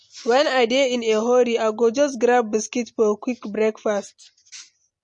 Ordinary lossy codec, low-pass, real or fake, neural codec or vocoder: MP3, 48 kbps; 10.8 kHz; real; none